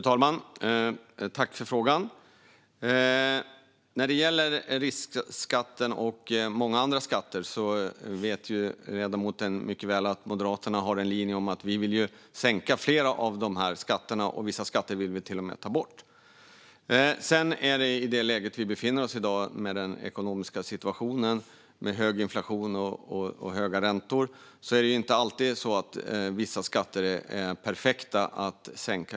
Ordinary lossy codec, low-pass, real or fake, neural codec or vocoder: none; none; real; none